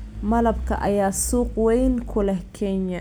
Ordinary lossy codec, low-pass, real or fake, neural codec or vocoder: none; none; real; none